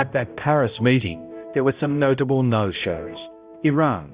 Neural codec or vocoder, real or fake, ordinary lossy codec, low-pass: codec, 16 kHz, 0.5 kbps, X-Codec, HuBERT features, trained on balanced general audio; fake; Opus, 64 kbps; 3.6 kHz